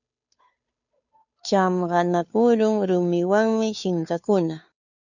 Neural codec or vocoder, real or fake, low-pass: codec, 16 kHz, 2 kbps, FunCodec, trained on Chinese and English, 25 frames a second; fake; 7.2 kHz